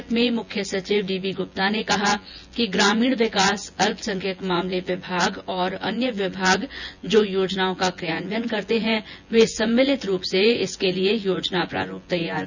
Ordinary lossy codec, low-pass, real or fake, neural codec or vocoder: none; 7.2 kHz; fake; vocoder, 24 kHz, 100 mel bands, Vocos